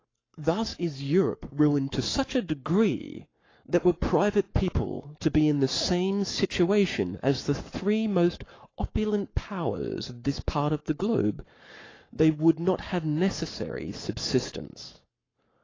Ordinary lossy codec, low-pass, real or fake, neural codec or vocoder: AAC, 32 kbps; 7.2 kHz; fake; codec, 44.1 kHz, 7.8 kbps, Pupu-Codec